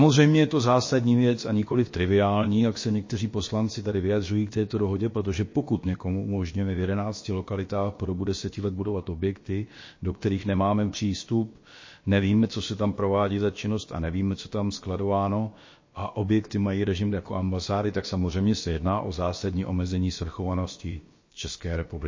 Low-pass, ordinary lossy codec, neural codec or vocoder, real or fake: 7.2 kHz; MP3, 32 kbps; codec, 16 kHz, about 1 kbps, DyCAST, with the encoder's durations; fake